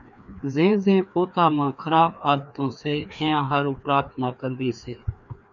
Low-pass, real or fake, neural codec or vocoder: 7.2 kHz; fake; codec, 16 kHz, 2 kbps, FreqCodec, larger model